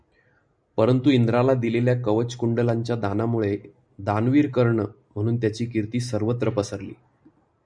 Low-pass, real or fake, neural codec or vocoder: 9.9 kHz; real; none